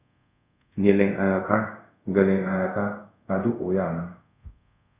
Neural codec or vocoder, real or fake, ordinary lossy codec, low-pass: codec, 24 kHz, 0.5 kbps, DualCodec; fake; Opus, 64 kbps; 3.6 kHz